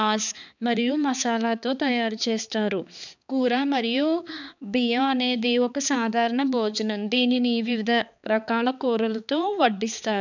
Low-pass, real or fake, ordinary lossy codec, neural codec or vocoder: 7.2 kHz; fake; none; codec, 16 kHz, 4 kbps, X-Codec, HuBERT features, trained on balanced general audio